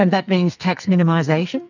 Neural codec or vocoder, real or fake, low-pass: codec, 44.1 kHz, 2.6 kbps, SNAC; fake; 7.2 kHz